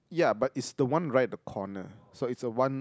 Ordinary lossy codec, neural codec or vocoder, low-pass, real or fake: none; none; none; real